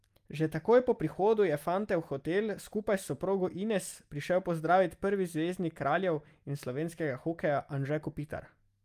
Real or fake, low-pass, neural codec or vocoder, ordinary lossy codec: real; 14.4 kHz; none; Opus, 32 kbps